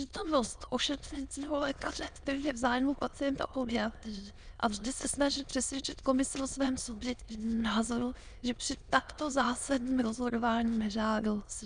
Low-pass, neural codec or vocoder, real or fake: 9.9 kHz; autoencoder, 22.05 kHz, a latent of 192 numbers a frame, VITS, trained on many speakers; fake